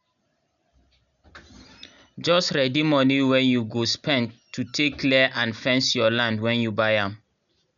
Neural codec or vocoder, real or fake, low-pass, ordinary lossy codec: none; real; 7.2 kHz; none